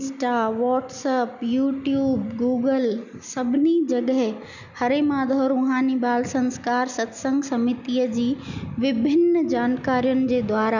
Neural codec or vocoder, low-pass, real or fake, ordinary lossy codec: none; 7.2 kHz; real; none